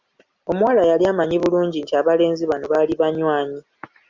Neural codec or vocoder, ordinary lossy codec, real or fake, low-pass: none; Opus, 64 kbps; real; 7.2 kHz